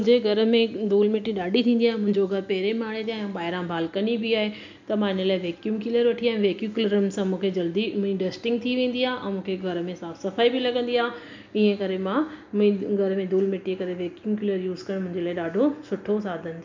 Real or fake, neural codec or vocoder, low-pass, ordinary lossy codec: real; none; 7.2 kHz; MP3, 64 kbps